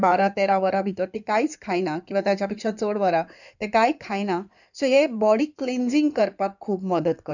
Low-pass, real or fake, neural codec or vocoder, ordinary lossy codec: 7.2 kHz; fake; codec, 16 kHz in and 24 kHz out, 2.2 kbps, FireRedTTS-2 codec; none